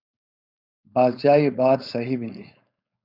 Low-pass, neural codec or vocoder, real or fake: 5.4 kHz; codec, 16 kHz, 4.8 kbps, FACodec; fake